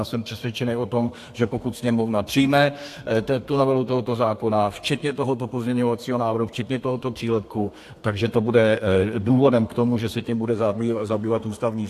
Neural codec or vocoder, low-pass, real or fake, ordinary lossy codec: codec, 32 kHz, 1.9 kbps, SNAC; 14.4 kHz; fake; AAC, 64 kbps